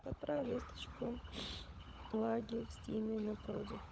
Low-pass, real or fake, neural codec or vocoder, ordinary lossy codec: none; fake; codec, 16 kHz, 16 kbps, FunCodec, trained on LibriTTS, 50 frames a second; none